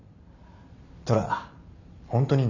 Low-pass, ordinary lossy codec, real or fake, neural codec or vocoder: 7.2 kHz; none; real; none